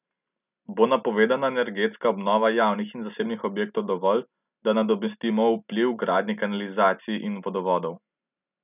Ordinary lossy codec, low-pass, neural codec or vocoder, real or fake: none; 3.6 kHz; none; real